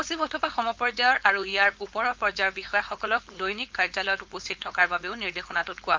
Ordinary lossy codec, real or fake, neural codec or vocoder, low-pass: Opus, 32 kbps; fake; codec, 16 kHz, 4.8 kbps, FACodec; 7.2 kHz